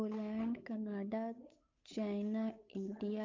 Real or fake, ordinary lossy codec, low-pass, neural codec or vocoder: fake; MP3, 48 kbps; 7.2 kHz; codec, 16 kHz, 8 kbps, FunCodec, trained on Chinese and English, 25 frames a second